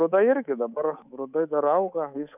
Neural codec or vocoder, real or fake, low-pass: codec, 24 kHz, 3.1 kbps, DualCodec; fake; 3.6 kHz